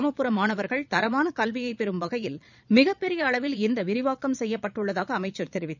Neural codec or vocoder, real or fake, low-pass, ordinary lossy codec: vocoder, 22.05 kHz, 80 mel bands, Vocos; fake; 7.2 kHz; none